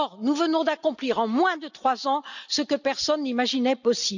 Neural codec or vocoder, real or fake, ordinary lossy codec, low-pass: none; real; none; 7.2 kHz